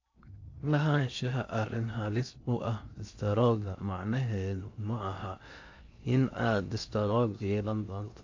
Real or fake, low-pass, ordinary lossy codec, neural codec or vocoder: fake; 7.2 kHz; AAC, 48 kbps; codec, 16 kHz in and 24 kHz out, 0.6 kbps, FocalCodec, streaming, 2048 codes